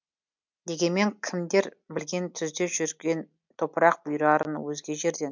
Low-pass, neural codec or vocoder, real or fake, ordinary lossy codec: 7.2 kHz; none; real; MP3, 64 kbps